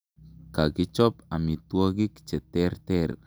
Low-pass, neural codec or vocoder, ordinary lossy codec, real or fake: none; none; none; real